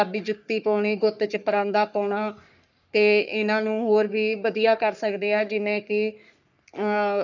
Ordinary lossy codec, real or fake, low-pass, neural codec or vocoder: none; fake; 7.2 kHz; codec, 44.1 kHz, 3.4 kbps, Pupu-Codec